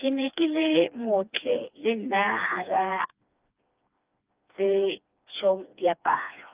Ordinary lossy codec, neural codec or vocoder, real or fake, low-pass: Opus, 24 kbps; codec, 16 kHz, 2 kbps, FreqCodec, smaller model; fake; 3.6 kHz